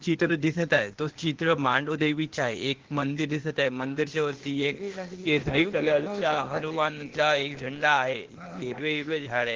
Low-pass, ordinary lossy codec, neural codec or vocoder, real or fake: 7.2 kHz; Opus, 16 kbps; codec, 16 kHz, 0.8 kbps, ZipCodec; fake